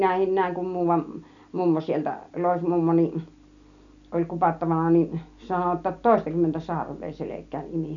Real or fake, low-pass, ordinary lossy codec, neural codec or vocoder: real; 7.2 kHz; none; none